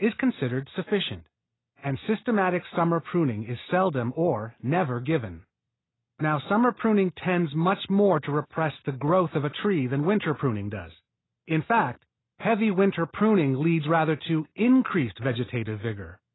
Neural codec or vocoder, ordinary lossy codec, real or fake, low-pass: none; AAC, 16 kbps; real; 7.2 kHz